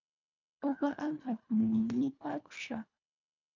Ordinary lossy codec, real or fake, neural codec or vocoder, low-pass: MP3, 64 kbps; fake; codec, 24 kHz, 1.5 kbps, HILCodec; 7.2 kHz